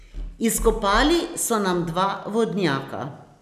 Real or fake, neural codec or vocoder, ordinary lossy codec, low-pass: real; none; none; 14.4 kHz